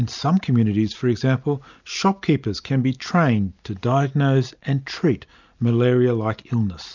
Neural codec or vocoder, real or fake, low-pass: none; real; 7.2 kHz